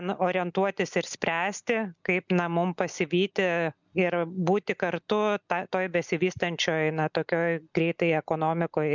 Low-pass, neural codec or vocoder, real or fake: 7.2 kHz; none; real